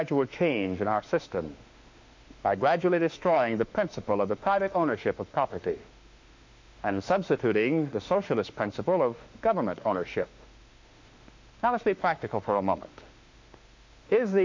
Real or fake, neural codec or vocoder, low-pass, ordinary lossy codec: fake; autoencoder, 48 kHz, 32 numbers a frame, DAC-VAE, trained on Japanese speech; 7.2 kHz; MP3, 48 kbps